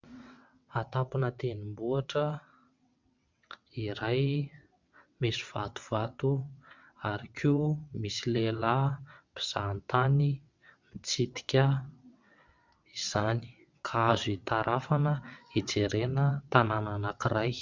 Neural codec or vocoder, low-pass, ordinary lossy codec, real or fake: vocoder, 22.05 kHz, 80 mel bands, WaveNeXt; 7.2 kHz; MP3, 64 kbps; fake